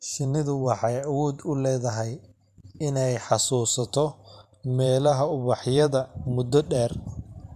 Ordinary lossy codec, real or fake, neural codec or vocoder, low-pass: none; fake; vocoder, 44.1 kHz, 128 mel bands every 512 samples, BigVGAN v2; 14.4 kHz